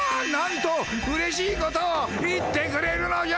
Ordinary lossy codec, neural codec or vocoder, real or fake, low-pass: none; none; real; none